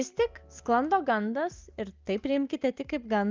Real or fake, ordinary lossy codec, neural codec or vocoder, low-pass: real; Opus, 24 kbps; none; 7.2 kHz